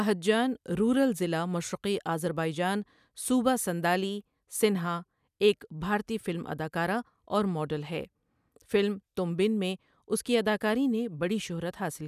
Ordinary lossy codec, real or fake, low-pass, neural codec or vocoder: none; real; 14.4 kHz; none